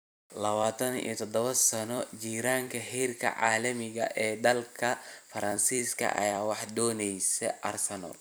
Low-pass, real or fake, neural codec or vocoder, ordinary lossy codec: none; fake; vocoder, 44.1 kHz, 128 mel bands every 256 samples, BigVGAN v2; none